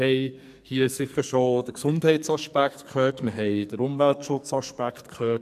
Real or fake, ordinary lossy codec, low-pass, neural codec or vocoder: fake; MP3, 96 kbps; 14.4 kHz; codec, 44.1 kHz, 2.6 kbps, SNAC